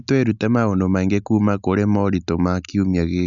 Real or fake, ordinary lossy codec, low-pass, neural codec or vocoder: real; none; 7.2 kHz; none